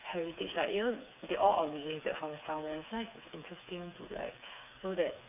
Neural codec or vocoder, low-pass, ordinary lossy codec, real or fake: codec, 16 kHz, 4 kbps, FreqCodec, smaller model; 3.6 kHz; AAC, 32 kbps; fake